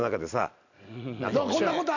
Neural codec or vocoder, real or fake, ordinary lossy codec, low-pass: none; real; none; 7.2 kHz